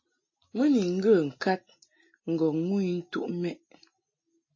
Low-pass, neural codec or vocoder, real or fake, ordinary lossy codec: 7.2 kHz; none; real; MP3, 32 kbps